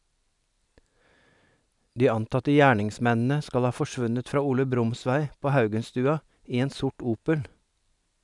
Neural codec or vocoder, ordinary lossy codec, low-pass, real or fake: none; none; 10.8 kHz; real